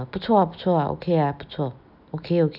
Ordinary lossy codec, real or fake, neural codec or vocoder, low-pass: none; real; none; 5.4 kHz